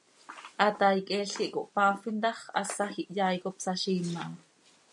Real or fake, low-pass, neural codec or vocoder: real; 10.8 kHz; none